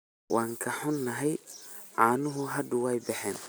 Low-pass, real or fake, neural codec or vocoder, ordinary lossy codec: none; real; none; none